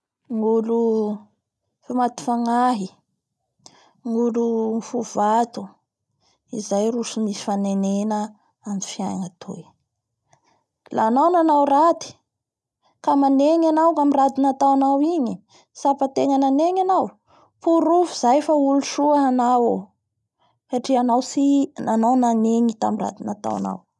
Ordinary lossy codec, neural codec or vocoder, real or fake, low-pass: none; none; real; none